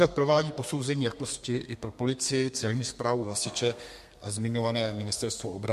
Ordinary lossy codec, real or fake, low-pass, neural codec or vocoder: MP3, 64 kbps; fake; 14.4 kHz; codec, 32 kHz, 1.9 kbps, SNAC